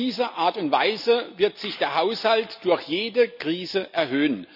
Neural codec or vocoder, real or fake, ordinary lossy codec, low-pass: none; real; none; 5.4 kHz